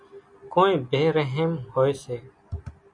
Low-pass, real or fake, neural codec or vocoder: 9.9 kHz; real; none